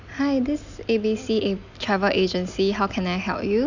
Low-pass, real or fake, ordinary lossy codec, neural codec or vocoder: 7.2 kHz; real; none; none